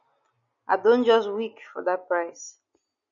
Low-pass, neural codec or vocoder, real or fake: 7.2 kHz; none; real